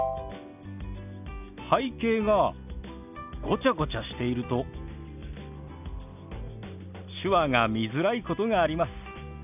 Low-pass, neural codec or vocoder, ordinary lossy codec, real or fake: 3.6 kHz; none; none; real